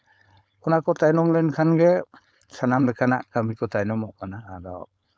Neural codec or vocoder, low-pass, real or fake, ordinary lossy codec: codec, 16 kHz, 4.8 kbps, FACodec; none; fake; none